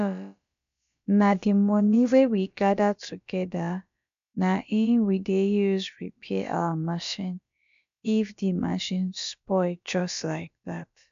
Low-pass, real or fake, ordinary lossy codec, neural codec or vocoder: 7.2 kHz; fake; MP3, 64 kbps; codec, 16 kHz, about 1 kbps, DyCAST, with the encoder's durations